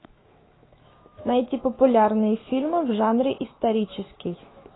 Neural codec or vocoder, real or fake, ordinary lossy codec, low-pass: autoencoder, 48 kHz, 128 numbers a frame, DAC-VAE, trained on Japanese speech; fake; AAC, 16 kbps; 7.2 kHz